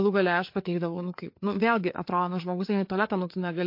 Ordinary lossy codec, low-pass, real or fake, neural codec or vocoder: MP3, 32 kbps; 5.4 kHz; fake; codec, 16 kHz, 4 kbps, FreqCodec, larger model